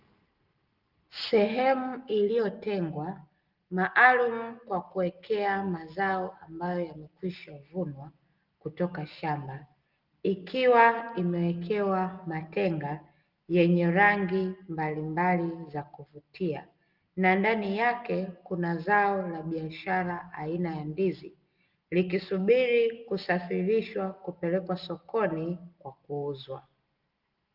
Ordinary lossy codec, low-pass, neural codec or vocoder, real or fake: Opus, 32 kbps; 5.4 kHz; none; real